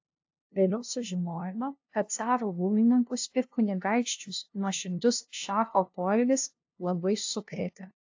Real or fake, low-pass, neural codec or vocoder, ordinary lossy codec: fake; 7.2 kHz; codec, 16 kHz, 0.5 kbps, FunCodec, trained on LibriTTS, 25 frames a second; AAC, 48 kbps